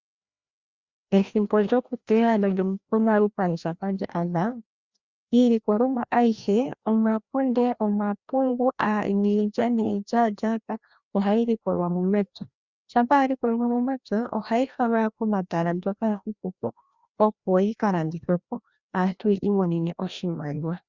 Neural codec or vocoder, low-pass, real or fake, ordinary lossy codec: codec, 16 kHz, 1 kbps, FreqCodec, larger model; 7.2 kHz; fake; Opus, 64 kbps